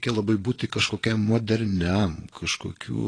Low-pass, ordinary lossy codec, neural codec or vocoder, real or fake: 9.9 kHz; AAC, 32 kbps; vocoder, 22.05 kHz, 80 mel bands, Vocos; fake